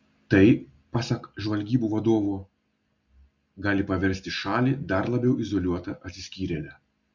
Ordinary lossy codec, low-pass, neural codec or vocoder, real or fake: Opus, 64 kbps; 7.2 kHz; none; real